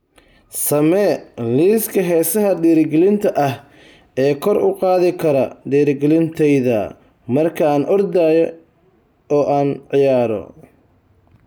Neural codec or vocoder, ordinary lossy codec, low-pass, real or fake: none; none; none; real